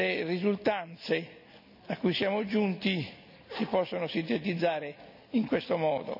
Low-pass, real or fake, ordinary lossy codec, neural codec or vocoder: 5.4 kHz; real; none; none